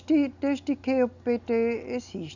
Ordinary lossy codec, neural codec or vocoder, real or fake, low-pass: none; none; real; 7.2 kHz